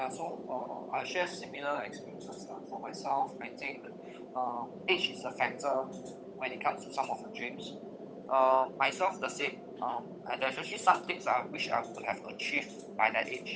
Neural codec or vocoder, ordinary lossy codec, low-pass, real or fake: codec, 16 kHz, 8 kbps, FunCodec, trained on Chinese and English, 25 frames a second; none; none; fake